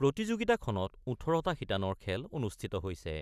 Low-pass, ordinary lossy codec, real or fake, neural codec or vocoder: 14.4 kHz; none; real; none